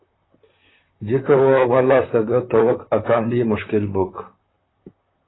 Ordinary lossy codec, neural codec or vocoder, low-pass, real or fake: AAC, 16 kbps; vocoder, 44.1 kHz, 128 mel bands, Pupu-Vocoder; 7.2 kHz; fake